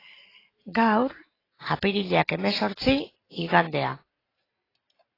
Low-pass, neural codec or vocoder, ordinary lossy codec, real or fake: 5.4 kHz; vocoder, 44.1 kHz, 128 mel bands every 256 samples, BigVGAN v2; AAC, 24 kbps; fake